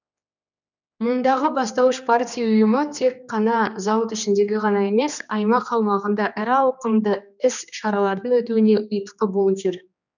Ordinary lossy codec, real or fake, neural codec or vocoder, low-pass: none; fake; codec, 16 kHz, 2 kbps, X-Codec, HuBERT features, trained on general audio; 7.2 kHz